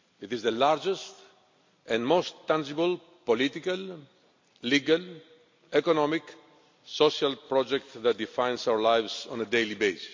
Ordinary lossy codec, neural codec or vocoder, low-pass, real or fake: MP3, 48 kbps; none; 7.2 kHz; real